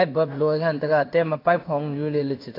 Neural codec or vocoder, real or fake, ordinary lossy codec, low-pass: codec, 16 kHz in and 24 kHz out, 1 kbps, XY-Tokenizer; fake; AAC, 32 kbps; 5.4 kHz